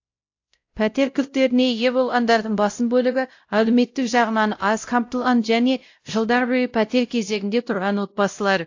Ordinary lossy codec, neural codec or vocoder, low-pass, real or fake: AAC, 48 kbps; codec, 16 kHz, 0.5 kbps, X-Codec, WavLM features, trained on Multilingual LibriSpeech; 7.2 kHz; fake